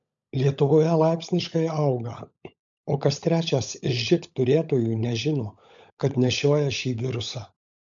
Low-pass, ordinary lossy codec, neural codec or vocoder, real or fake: 7.2 kHz; MP3, 64 kbps; codec, 16 kHz, 16 kbps, FunCodec, trained on LibriTTS, 50 frames a second; fake